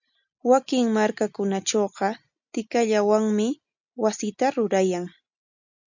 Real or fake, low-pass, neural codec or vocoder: real; 7.2 kHz; none